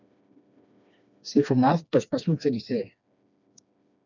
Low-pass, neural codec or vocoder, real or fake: 7.2 kHz; codec, 16 kHz, 2 kbps, FreqCodec, smaller model; fake